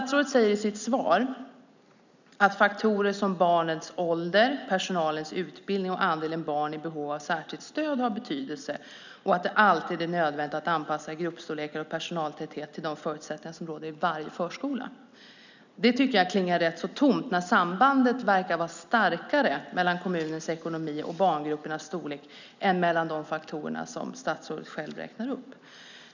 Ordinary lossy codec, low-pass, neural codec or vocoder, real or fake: none; 7.2 kHz; none; real